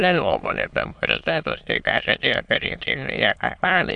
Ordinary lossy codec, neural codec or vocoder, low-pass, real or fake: AAC, 64 kbps; autoencoder, 22.05 kHz, a latent of 192 numbers a frame, VITS, trained on many speakers; 9.9 kHz; fake